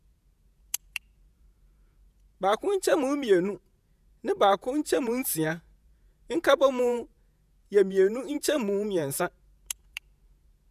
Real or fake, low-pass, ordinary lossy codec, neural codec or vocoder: fake; 14.4 kHz; none; vocoder, 48 kHz, 128 mel bands, Vocos